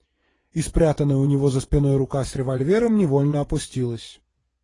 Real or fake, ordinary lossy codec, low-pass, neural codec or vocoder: fake; AAC, 32 kbps; 10.8 kHz; vocoder, 24 kHz, 100 mel bands, Vocos